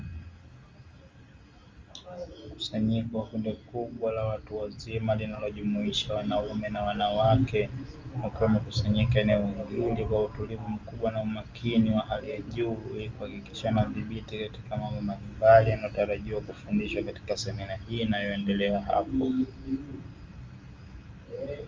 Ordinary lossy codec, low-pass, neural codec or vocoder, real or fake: Opus, 32 kbps; 7.2 kHz; none; real